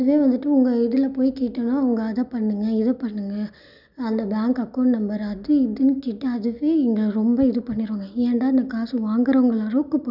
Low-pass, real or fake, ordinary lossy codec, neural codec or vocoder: 5.4 kHz; real; none; none